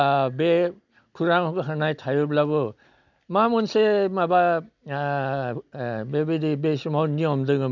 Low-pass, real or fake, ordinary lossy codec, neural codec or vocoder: 7.2 kHz; real; none; none